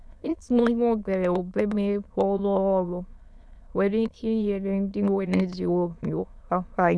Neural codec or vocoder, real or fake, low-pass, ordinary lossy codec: autoencoder, 22.05 kHz, a latent of 192 numbers a frame, VITS, trained on many speakers; fake; none; none